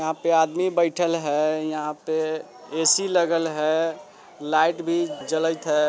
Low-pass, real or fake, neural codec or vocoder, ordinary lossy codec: none; real; none; none